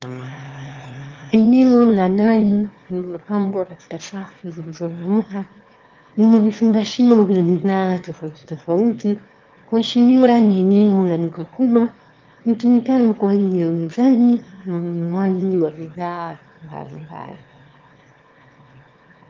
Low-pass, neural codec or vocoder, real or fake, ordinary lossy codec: 7.2 kHz; autoencoder, 22.05 kHz, a latent of 192 numbers a frame, VITS, trained on one speaker; fake; Opus, 32 kbps